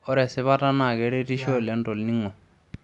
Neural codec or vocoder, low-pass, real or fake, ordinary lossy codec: none; 10.8 kHz; real; none